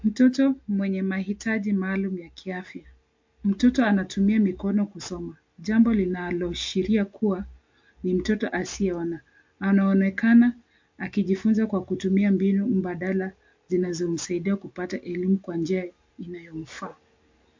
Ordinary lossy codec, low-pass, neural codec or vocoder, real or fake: MP3, 48 kbps; 7.2 kHz; none; real